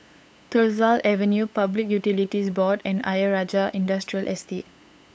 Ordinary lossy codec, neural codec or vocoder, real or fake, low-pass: none; codec, 16 kHz, 8 kbps, FunCodec, trained on LibriTTS, 25 frames a second; fake; none